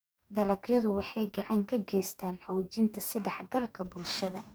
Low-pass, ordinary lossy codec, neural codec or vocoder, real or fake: none; none; codec, 44.1 kHz, 2.6 kbps, DAC; fake